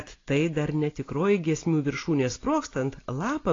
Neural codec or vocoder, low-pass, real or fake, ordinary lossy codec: none; 7.2 kHz; real; AAC, 32 kbps